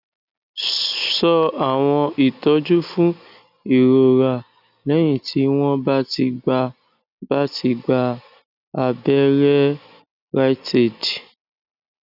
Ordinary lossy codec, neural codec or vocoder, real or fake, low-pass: none; none; real; 5.4 kHz